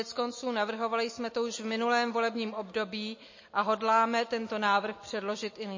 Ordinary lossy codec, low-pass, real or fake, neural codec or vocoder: MP3, 32 kbps; 7.2 kHz; real; none